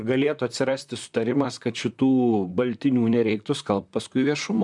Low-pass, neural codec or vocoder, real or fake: 10.8 kHz; vocoder, 44.1 kHz, 128 mel bands, Pupu-Vocoder; fake